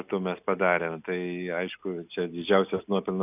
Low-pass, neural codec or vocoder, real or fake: 3.6 kHz; none; real